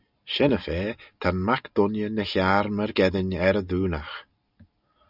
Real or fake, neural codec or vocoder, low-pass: real; none; 5.4 kHz